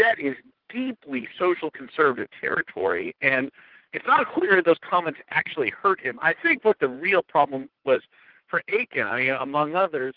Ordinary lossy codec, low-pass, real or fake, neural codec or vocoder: Opus, 16 kbps; 5.4 kHz; fake; vocoder, 44.1 kHz, 80 mel bands, Vocos